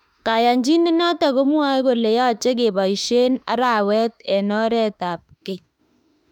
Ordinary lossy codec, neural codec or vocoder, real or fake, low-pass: none; autoencoder, 48 kHz, 32 numbers a frame, DAC-VAE, trained on Japanese speech; fake; 19.8 kHz